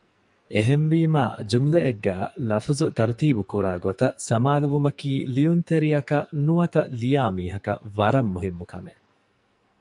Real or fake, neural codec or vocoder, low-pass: fake; codec, 44.1 kHz, 2.6 kbps, SNAC; 10.8 kHz